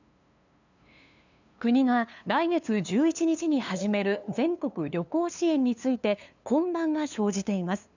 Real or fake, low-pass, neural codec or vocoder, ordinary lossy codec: fake; 7.2 kHz; codec, 16 kHz, 2 kbps, FunCodec, trained on LibriTTS, 25 frames a second; none